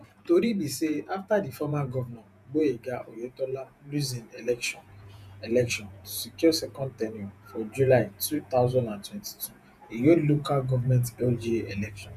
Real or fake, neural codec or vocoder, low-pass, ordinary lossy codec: fake; vocoder, 48 kHz, 128 mel bands, Vocos; 14.4 kHz; none